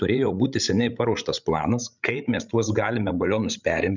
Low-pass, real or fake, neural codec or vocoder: 7.2 kHz; fake; codec, 16 kHz, 16 kbps, FreqCodec, larger model